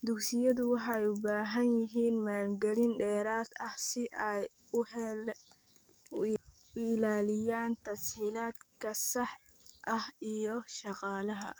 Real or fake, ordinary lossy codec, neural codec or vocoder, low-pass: fake; none; codec, 44.1 kHz, 7.8 kbps, DAC; none